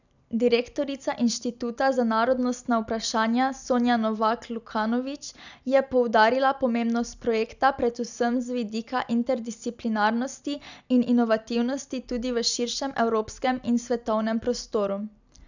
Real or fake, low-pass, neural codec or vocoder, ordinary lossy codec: real; 7.2 kHz; none; none